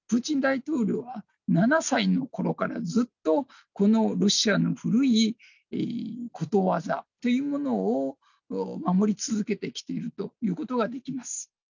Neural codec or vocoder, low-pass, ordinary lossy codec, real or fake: none; 7.2 kHz; none; real